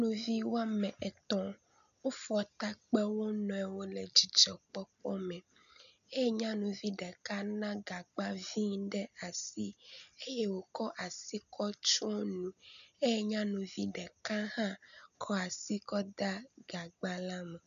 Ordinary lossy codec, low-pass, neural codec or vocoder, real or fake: MP3, 96 kbps; 7.2 kHz; none; real